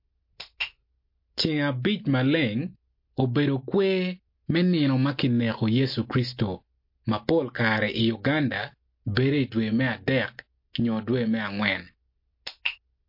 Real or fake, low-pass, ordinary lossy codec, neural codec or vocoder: real; 5.4 kHz; MP3, 32 kbps; none